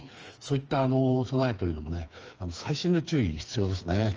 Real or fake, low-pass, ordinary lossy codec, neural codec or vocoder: fake; 7.2 kHz; Opus, 24 kbps; codec, 16 kHz, 4 kbps, FreqCodec, smaller model